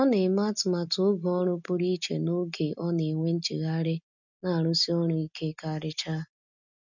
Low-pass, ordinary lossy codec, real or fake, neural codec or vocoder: 7.2 kHz; none; real; none